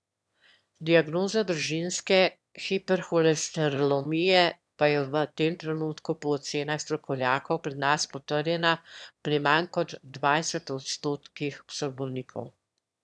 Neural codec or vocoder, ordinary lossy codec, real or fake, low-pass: autoencoder, 22.05 kHz, a latent of 192 numbers a frame, VITS, trained on one speaker; none; fake; none